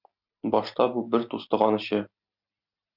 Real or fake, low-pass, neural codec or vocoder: real; 5.4 kHz; none